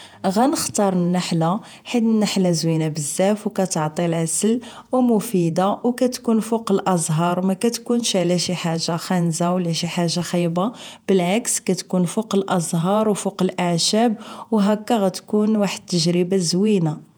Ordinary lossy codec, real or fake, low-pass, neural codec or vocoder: none; fake; none; vocoder, 48 kHz, 128 mel bands, Vocos